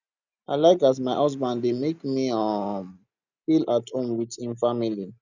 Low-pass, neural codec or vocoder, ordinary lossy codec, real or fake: 7.2 kHz; none; none; real